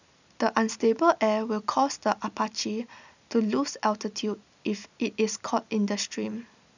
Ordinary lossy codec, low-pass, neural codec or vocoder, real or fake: none; 7.2 kHz; none; real